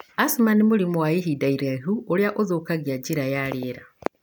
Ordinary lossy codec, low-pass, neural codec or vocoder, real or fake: none; none; none; real